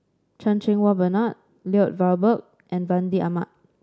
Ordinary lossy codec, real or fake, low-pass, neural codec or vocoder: none; real; none; none